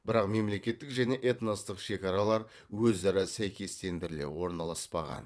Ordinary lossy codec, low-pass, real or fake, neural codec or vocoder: none; none; fake; vocoder, 22.05 kHz, 80 mel bands, WaveNeXt